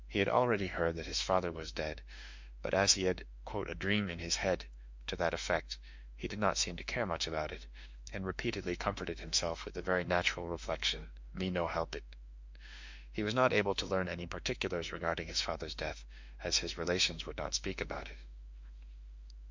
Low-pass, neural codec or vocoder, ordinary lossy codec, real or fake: 7.2 kHz; autoencoder, 48 kHz, 32 numbers a frame, DAC-VAE, trained on Japanese speech; MP3, 64 kbps; fake